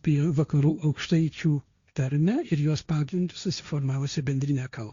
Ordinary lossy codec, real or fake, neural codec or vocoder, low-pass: Opus, 64 kbps; fake; codec, 16 kHz, 1.1 kbps, Voila-Tokenizer; 7.2 kHz